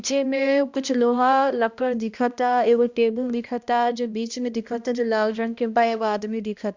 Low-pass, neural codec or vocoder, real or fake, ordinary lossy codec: 7.2 kHz; codec, 16 kHz, 1 kbps, X-Codec, HuBERT features, trained on balanced general audio; fake; Opus, 64 kbps